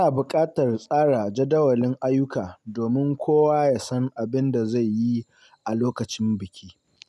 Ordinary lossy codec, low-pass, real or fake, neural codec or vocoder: none; none; real; none